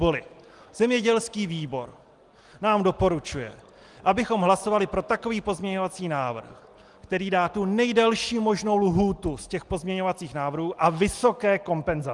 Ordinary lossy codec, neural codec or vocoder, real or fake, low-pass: Opus, 24 kbps; none; real; 10.8 kHz